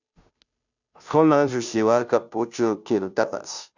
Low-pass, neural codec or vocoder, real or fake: 7.2 kHz; codec, 16 kHz, 0.5 kbps, FunCodec, trained on Chinese and English, 25 frames a second; fake